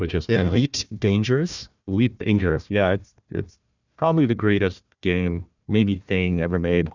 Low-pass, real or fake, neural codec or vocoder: 7.2 kHz; fake; codec, 16 kHz, 1 kbps, FunCodec, trained on Chinese and English, 50 frames a second